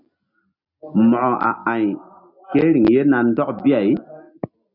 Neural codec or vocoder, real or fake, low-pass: none; real; 5.4 kHz